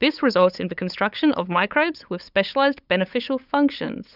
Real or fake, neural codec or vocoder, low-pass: fake; codec, 16 kHz, 8 kbps, FunCodec, trained on LibriTTS, 25 frames a second; 5.4 kHz